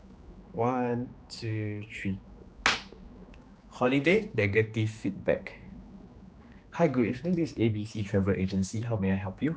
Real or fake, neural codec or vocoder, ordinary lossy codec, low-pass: fake; codec, 16 kHz, 2 kbps, X-Codec, HuBERT features, trained on general audio; none; none